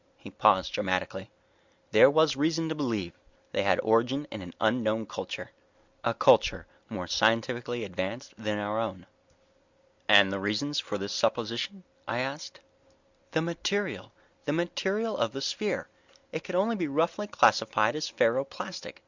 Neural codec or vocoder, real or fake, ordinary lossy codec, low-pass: none; real; Opus, 64 kbps; 7.2 kHz